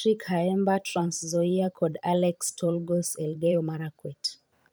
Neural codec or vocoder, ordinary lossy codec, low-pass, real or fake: vocoder, 44.1 kHz, 128 mel bands every 512 samples, BigVGAN v2; none; none; fake